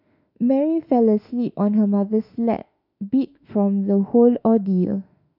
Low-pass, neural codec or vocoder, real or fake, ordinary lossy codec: 5.4 kHz; autoencoder, 48 kHz, 32 numbers a frame, DAC-VAE, trained on Japanese speech; fake; none